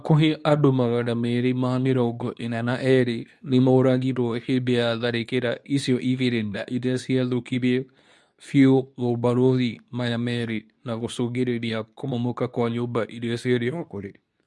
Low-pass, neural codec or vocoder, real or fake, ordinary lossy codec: none; codec, 24 kHz, 0.9 kbps, WavTokenizer, medium speech release version 2; fake; none